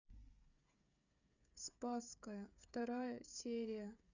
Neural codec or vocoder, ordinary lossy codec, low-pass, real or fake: codec, 16 kHz, 8 kbps, FreqCodec, smaller model; none; 7.2 kHz; fake